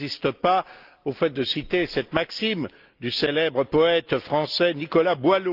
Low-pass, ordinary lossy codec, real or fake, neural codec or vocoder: 5.4 kHz; Opus, 24 kbps; real; none